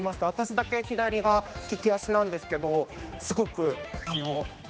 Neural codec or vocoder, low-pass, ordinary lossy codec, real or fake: codec, 16 kHz, 2 kbps, X-Codec, HuBERT features, trained on general audio; none; none; fake